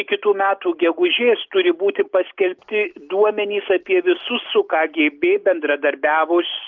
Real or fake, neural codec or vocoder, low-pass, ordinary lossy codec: real; none; 7.2 kHz; Opus, 32 kbps